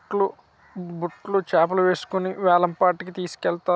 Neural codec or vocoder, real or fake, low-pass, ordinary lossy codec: none; real; none; none